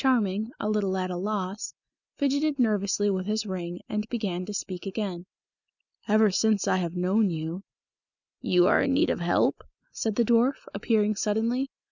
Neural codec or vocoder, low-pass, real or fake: none; 7.2 kHz; real